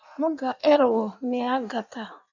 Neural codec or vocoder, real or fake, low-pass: codec, 16 kHz in and 24 kHz out, 1.1 kbps, FireRedTTS-2 codec; fake; 7.2 kHz